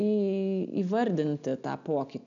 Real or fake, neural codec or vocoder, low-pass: real; none; 7.2 kHz